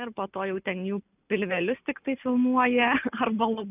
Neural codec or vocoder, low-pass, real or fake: none; 3.6 kHz; real